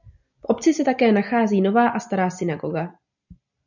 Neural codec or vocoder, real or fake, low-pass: none; real; 7.2 kHz